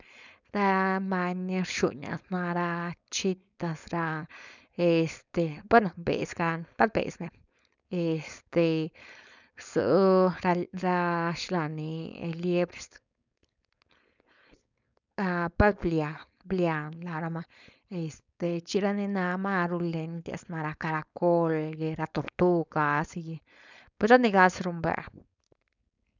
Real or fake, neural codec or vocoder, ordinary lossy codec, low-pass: fake; codec, 16 kHz, 4.8 kbps, FACodec; none; 7.2 kHz